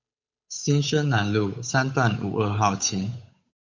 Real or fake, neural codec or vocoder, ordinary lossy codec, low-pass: fake; codec, 16 kHz, 8 kbps, FunCodec, trained on Chinese and English, 25 frames a second; MP3, 64 kbps; 7.2 kHz